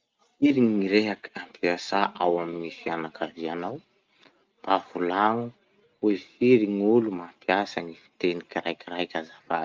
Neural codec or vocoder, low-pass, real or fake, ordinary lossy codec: none; 7.2 kHz; real; Opus, 24 kbps